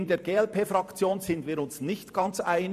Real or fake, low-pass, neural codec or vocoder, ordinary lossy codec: fake; 14.4 kHz; vocoder, 44.1 kHz, 128 mel bands every 512 samples, BigVGAN v2; none